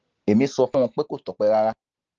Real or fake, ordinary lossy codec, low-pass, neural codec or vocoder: real; Opus, 16 kbps; 7.2 kHz; none